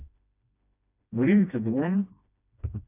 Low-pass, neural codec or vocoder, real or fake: 3.6 kHz; codec, 16 kHz, 1 kbps, FreqCodec, smaller model; fake